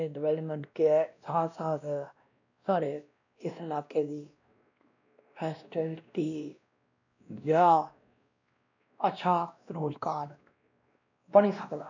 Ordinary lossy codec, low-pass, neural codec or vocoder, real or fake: none; 7.2 kHz; codec, 16 kHz, 1 kbps, X-Codec, WavLM features, trained on Multilingual LibriSpeech; fake